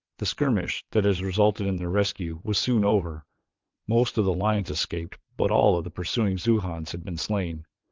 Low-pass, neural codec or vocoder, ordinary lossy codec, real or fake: 7.2 kHz; vocoder, 22.05 kHz, 80 mel bands, WaveNeXt; Opus, 32 kbps; fake